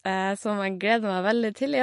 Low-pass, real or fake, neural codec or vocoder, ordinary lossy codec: 14.4 kHz; real; none; MP3, 48 kbps